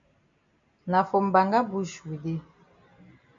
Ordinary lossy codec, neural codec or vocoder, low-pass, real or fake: MP3, 64 kbps; none; 7.2 kHz; real